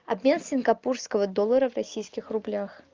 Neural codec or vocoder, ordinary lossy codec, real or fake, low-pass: none; Opus, 24 kbps; real; 7.2 kHz